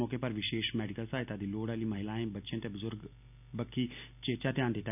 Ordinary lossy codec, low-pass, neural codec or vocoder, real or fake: none; 3.6 kHz; none; real